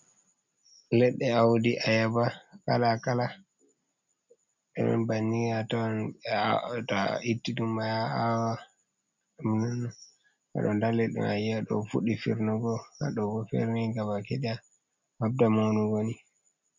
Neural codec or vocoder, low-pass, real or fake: none; 7.2 kHz; real